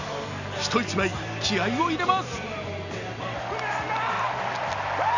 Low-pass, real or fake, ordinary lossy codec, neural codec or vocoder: 7.2 kHz; real; none; none